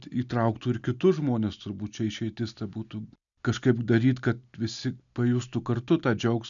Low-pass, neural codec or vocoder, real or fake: 7.2 kHz; none; real